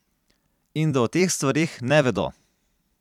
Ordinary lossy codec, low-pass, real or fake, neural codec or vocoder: none; 19.8 kHz; fake; vocoder, 44.1 kHz, 128 mel bands every 256 samples, BigVGAN v2